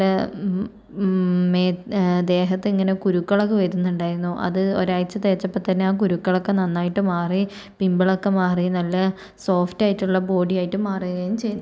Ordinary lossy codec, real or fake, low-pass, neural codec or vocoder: none; real; none; none